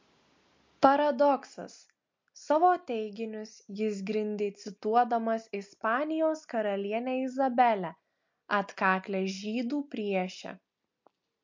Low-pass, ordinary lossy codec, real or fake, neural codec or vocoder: 7.2 kHz; MP3, 48 kbps; real; none